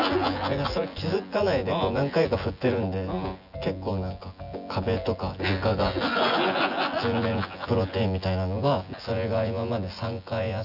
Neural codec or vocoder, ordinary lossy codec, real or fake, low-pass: vocoder, 24 kHz, 100 mel bands, Vocos; none; fake; 5.4 kHz